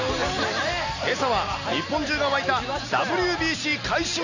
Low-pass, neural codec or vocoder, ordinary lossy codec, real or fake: 7.2 kHz; none; none; real